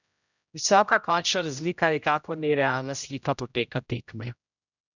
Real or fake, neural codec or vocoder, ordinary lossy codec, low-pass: fake; codec, 16 kHz, 0.5 kbps, X-Codec, HuBERT features, trained on general audio; none; 7.2 kHz